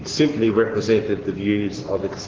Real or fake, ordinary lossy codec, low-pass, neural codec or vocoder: fake; Opus, 16 kbps; 7.2 kHz; codec, 44.1 kHz, 7.8 kbps, Pupu-Codec